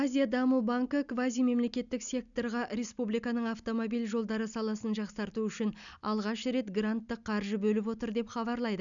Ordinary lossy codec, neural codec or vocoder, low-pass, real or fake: none; none; 7.2 kHz; real